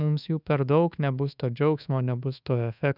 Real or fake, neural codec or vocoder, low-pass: fake; codec, 24 kHz, 1.2 kbps, DualCodec; 5.4 kHz